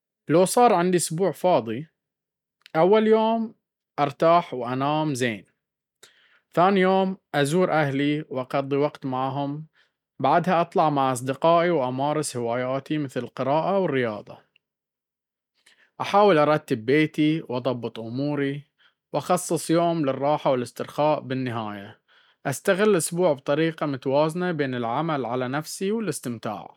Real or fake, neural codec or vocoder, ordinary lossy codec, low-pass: real; none; none; 19.8 kHz